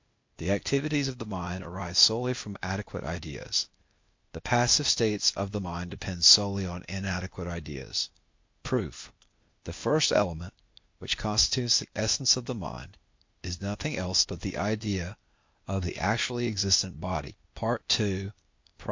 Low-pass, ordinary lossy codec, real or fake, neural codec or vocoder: 7.2 kHz; MP3, 48 kbps; fake; codec, 16 kHz, 0.8 kbps, ZipCodec